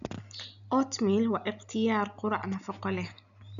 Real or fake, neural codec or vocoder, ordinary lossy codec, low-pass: real; none; none; 7.2 kHz